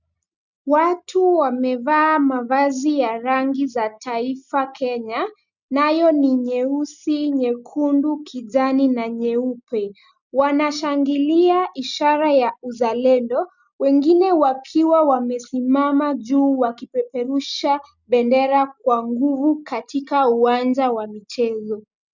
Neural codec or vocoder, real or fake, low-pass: none; real; 7.2 kHz